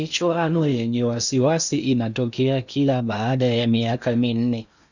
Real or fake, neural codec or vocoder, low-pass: fake; codec, 16 kHz in and 24 kHz out, 0.8 kbps, FocalCodec, streaming, 65536 codes; 7.2 kHz